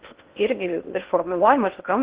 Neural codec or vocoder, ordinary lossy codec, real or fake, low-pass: codec, 16 kHz in and 24 kHz out, 0.6 kbps, FocalCodec, streaming, 4096 codes; Opus, 16 kbps; fake; 3.6 kHz